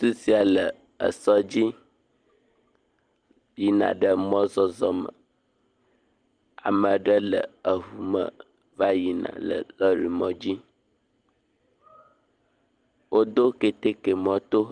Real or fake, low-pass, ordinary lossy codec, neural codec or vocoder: real; 9.9 kHz; Opus, 32 kbps; none